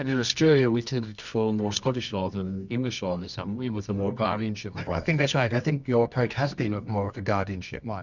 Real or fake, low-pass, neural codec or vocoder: fake; 7.2 kHz; codec, 24 kHz, 0.9 kbps, WavTokenizer, medium music audio release